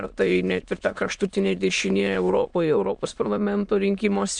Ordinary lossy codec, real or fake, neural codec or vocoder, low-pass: MP3, 96 kbps; fake; autoencoder, 22.05 kHz, a latent of 192 numbers a frame, VITS, trained on many speakers; 9.9 kHz